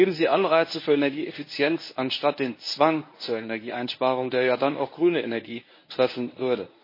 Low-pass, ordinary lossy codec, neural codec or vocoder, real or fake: 5.4 kHz; MP3, 24 kbps; codec, 24 kHz, 0.9 kbps, WavTokenizer, medium speech release version 1; fake